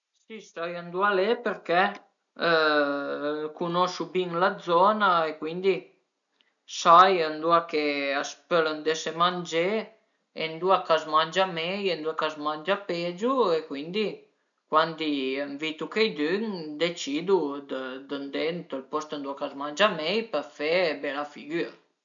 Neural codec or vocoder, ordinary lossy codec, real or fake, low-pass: none; none; real; 7.2 kHz